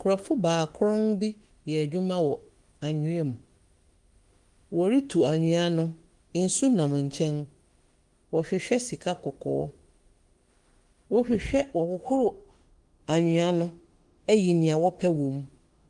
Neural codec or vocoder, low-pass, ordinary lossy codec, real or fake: autoencoder, 48 kHz, 32 numbers a frame, DAC-VAE, trained on Japanese speech; 10.8 kHz; Opus, 24 kbps; fake